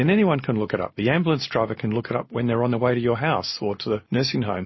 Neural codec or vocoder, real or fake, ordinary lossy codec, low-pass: none; real; MP3, 24 kbps; 7.2 kHz